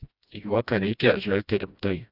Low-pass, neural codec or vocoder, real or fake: 5.4 kHz; codec, 16 kHz, 1 kbps, FreqCodec, smaller model; fake